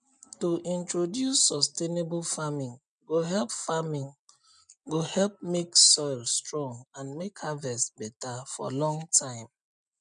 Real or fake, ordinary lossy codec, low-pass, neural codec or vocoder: real; none; 9.9 kHz; none